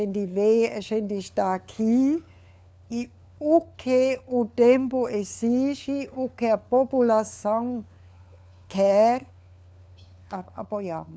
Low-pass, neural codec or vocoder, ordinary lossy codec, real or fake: none; codec, 16 kHz, 4 kbps, FunCodec, trained on LibriTTS, 50 frames a second; none; fake